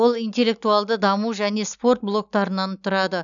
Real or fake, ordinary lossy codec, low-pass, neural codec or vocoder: real; none; 7.2 kHz; none